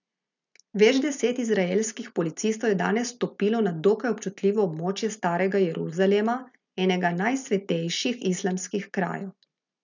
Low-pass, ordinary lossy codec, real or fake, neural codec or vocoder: 7.2 kHz; none; real; none